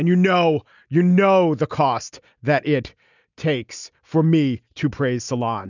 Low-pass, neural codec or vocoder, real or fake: 7.2 kHz; none; real